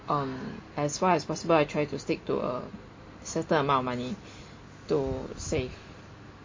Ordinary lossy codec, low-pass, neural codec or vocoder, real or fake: MP3, 32 kbps; 7.2 kHz; none; real